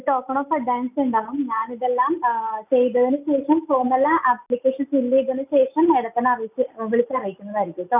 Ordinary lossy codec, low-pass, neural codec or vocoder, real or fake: none; 3.6 kHz; none; real